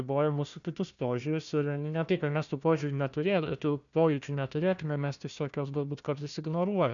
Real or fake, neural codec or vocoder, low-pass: fake; codec, 16 kHz, 1 kbps, FunCodec, trained on Chinese and English, 50 frames a second; 7.2 kHz